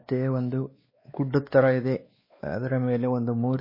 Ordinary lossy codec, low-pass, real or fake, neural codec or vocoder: MP3, 24 kbps; 5.4 kHz; fake; codec, 16 kHz, 2 kbps, X-Codec, WavLM features, trained on Multilingual LibriSpeech